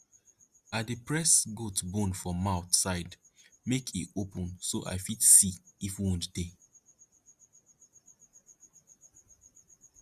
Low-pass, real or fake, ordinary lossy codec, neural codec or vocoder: 14.4 kHz; real; Opus, 64 kbps; none